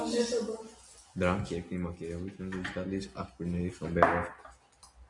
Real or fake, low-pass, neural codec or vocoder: real; 10.8 kHz; none